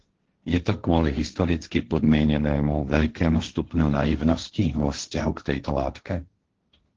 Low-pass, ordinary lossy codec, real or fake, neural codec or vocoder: 7.2 kHz; Opus, 16 kbps; fake; codec, 16 kHz, 1.1 kbps, Voila-Tokenizer